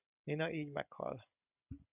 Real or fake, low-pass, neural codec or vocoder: real; 3.6 kHz; none